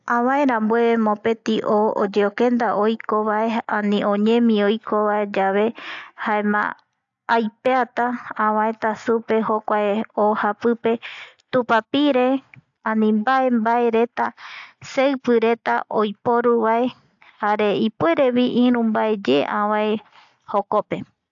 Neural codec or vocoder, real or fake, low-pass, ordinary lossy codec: none; real; 7.2 kHz; AAC, 64 kbps